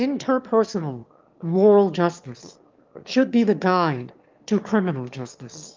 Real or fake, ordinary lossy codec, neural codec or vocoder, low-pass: fake; Opus, 32 kbps; autoencoder, 22.05 kHz, a latent of 192 numbers a frame, VITS, trained on one speaker; 7.2 kHz